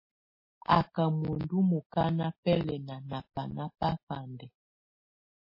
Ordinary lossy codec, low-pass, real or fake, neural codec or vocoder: MP3, 24 kbps; 5.4 kHz; real; none